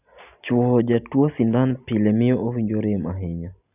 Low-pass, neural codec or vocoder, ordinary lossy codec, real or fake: 3.6 kHz; none; none; real